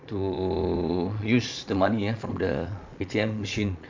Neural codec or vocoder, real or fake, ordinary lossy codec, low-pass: vocoder, 22.05 kHz, 80 mel bands, Vocos; fake; MP3, 64 kbps; 7.2 kHz